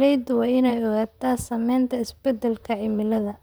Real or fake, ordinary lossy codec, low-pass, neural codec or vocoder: fake; none; none; vocoder, 44.1 kHz, 128 mel bands, Pupu-Vocoder